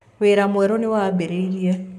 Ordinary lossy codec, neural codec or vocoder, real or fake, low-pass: none; codec, 44.1 kHz, 7.8 kbps, Pupu-Codec; fake; 14.4 kHz